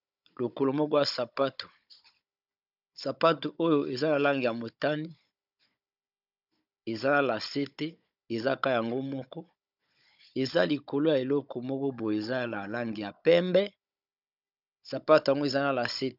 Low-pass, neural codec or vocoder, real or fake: 5.4 kHz; codec, 16 kHz, 16 kbps, FunCodec, trained on Chinese and English, 50 frames a second; fake